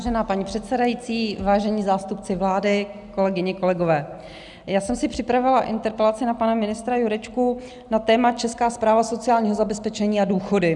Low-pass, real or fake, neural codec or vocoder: 10.8 kHz; real; none